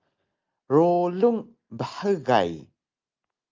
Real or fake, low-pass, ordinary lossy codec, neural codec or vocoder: real; 7.2 kHz; Opus, 24 kbps; none